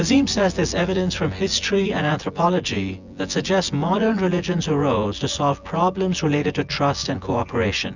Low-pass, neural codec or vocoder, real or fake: 7.2 kHz; vocoder, 24 kHz, 100 mel bands, Vocos; fake